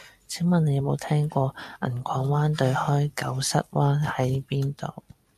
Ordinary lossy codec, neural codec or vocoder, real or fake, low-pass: MP3, 96 kbps; none; real; 14.4 kHz